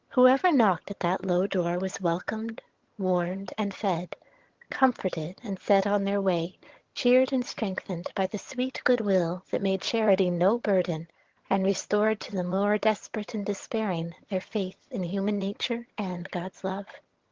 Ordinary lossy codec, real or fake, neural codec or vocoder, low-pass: Opus, 16 kbps; fake; vocoder, 22.05 kHz, 80 mel bands, HiFi-GAN; 7.2 kHz